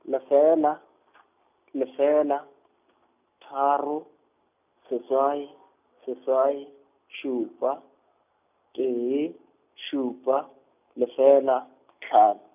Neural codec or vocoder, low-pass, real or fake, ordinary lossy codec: none; 3.6 kHz; real; none